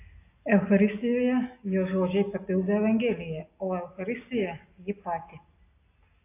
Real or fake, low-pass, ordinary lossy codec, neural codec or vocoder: real; 3.6 kHz; AAC, 24 kbps; none